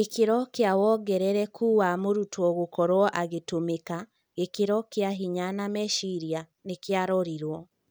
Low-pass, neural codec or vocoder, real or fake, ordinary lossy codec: none; none; real; none